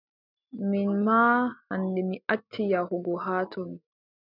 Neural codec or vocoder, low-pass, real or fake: none; 5.4 kHz; real